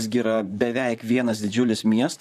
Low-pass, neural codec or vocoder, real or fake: 14.4 kHz; vocoder, 44.1 kHz, 128 mel bands, Pupu-Vocoder; fake